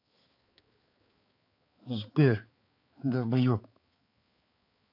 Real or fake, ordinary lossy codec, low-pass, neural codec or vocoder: fake; AAC, 32 kbps; 5.4 kHz; codec, 16 kHz, 4 kbps, X-Codec, HuBERT features, trained on balanced general audio